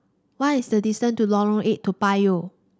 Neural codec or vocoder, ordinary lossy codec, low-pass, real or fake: none; none; none; real